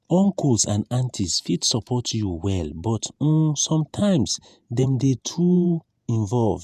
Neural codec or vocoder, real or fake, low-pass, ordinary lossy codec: vocoder, 48 kHz, 128 mel bands, Vocos; fake; 14.4 kHz; none